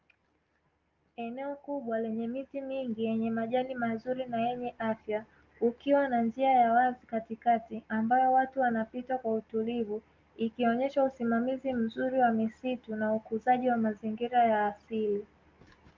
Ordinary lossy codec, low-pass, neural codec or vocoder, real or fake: Opus, 32 kbps; 7.2 kHz; none; real